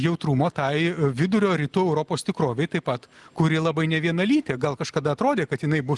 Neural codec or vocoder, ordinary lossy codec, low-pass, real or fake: none; Opus, 24 kbps; 10.8 kHz; real